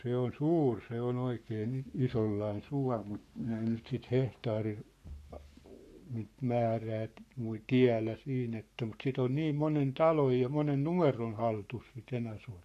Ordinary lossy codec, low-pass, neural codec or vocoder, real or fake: AAC, 64 kbps; 14.4 kHz; codec, 44.1 kHz, 7.8 kbps, Pupu-Codec; fake